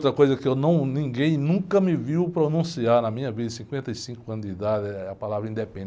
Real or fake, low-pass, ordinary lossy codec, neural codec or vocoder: real; none; none; none